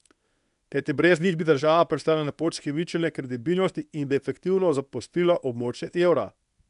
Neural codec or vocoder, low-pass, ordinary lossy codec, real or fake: codec, 24 kHz, 0.9 kbps, WavTokenizer, medium speech release version 1; 10.8 kHz; none; fake